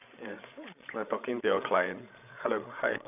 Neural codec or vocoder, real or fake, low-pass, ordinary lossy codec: codec, 16 kHz, 16 kbps, FreqCodec, larger model; fake; 3.6 kHz; none